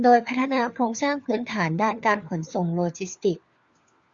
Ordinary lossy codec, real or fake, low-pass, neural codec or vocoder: Opus, 64 kbps; fake; 7.2 kHz; codec, 16 kHz, 4 kbps, FunCodec, trained on LibriTTS, 50 frames a second